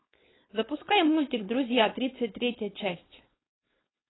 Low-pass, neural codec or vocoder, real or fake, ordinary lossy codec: 7.2 kHz; codec, 16 kHz, 4.8 kbps, FACodec; fake; AAC, 16 kbps